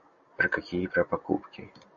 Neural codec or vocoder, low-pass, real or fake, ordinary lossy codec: none; 7.2 kHz; real; AAC, 64 kbps